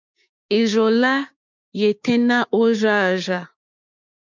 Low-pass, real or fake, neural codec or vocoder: 7.2 kHz; fake; codec, 16 kHz, 6 kbps, DAC